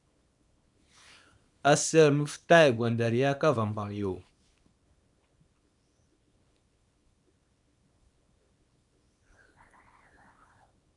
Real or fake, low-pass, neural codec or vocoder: fake; 10.8 kHz; codec, 24 kHz, 0.9 kbps, WavTokenizer, small release